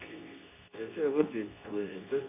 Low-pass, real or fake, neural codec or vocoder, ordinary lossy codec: 3.6 kHz; fake; codec, 24 kHz, 0.9 kbps, WavTokenizer, medium speech release version 2; none